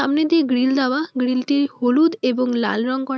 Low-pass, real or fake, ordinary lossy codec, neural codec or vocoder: none; real; none; none